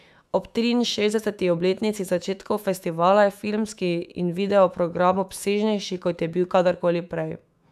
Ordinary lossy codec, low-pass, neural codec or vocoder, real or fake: none; 14.4 kHz; autoencoder, 48 kHz, 128 numbers a frame, DAC-VAE, trained on Japanese speech; fake